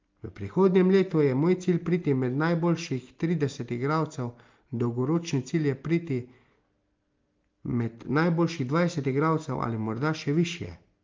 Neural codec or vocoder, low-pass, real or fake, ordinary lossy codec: none; 7.2 kHz; real; Opus, 32 kbps